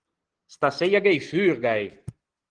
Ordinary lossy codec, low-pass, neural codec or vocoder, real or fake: Opus, 16 kbps; 9.9 kHz; none; real